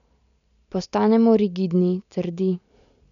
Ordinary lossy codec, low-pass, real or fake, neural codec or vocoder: none; 7.2 kHz; real; none